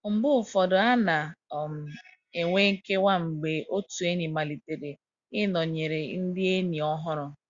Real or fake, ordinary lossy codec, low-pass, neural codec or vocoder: real; Opus, 64 kbps; 7.2 kHz; none